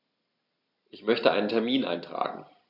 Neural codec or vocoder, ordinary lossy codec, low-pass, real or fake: none; none; 5.4 kHz; real